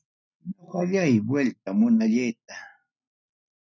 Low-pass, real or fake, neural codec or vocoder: 7.2 kHz; real; none